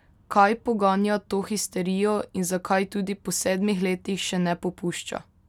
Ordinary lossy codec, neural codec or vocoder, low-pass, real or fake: none; none; 19.8 kHz; real